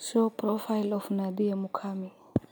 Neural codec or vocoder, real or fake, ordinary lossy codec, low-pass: none; real; none; none